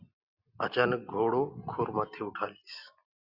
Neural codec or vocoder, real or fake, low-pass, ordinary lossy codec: none; real; 5.4 kHz; Opus, 64 kbps